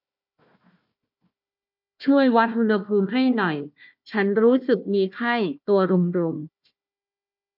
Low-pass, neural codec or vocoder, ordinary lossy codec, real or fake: 5.4 kHz; codec, 16 kHz, 1 kbps, FunCodec, trained on Chinese and English, 50 frames a second; MP3, 48 kbps; fake